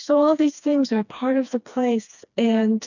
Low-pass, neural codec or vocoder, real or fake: 7.2 kHz; codec, 16 kHz, 2 kbps, FreqCodec, smaller model; fake